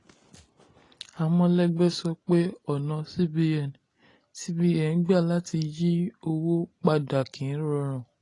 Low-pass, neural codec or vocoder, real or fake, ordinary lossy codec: 10.8 kHz; none; real; AAC, 32 kbps